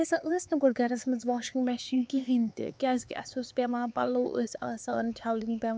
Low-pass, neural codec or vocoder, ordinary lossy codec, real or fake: none; codec, 16 kHz, 4 kbps, X-Codec, HuBERT features, trained on LibriSpeech; none; fake